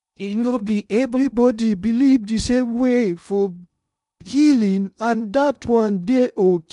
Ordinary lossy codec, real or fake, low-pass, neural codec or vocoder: none; fake; 10.8 kHz; codec, 16 kHz in and 24 kHz out, 0.6 kbps, FocalCodec, streaming, 2048 codes